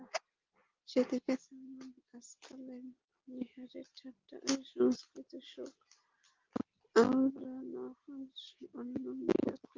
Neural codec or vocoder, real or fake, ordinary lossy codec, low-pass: none; real; Opus, 32 kbps; 7.2 kHz